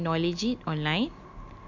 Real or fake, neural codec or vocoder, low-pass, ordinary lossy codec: real; none; 7.2 kHz; MP3, 64 kbps